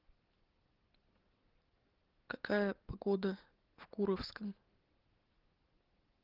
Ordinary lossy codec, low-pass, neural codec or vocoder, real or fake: Opus, 16 kbps; 5.4 kHz; none; real